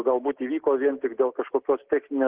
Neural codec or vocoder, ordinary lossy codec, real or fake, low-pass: none; Opus, 16 kbps; real; 3.6 kHz